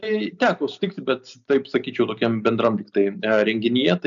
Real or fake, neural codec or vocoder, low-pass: real; none; 7.2 kHz